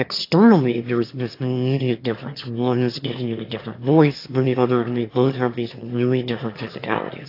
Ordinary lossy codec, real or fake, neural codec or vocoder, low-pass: AAC, 32 kbps; fake; autoencoder, 22.05 kHz, a latent of 192 numbers a frame, VITS, trained on one speaker; 5.4 kHz